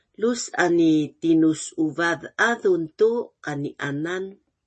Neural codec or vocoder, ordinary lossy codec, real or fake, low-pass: none; MP3, 32 kbps; real; 9.9 kHz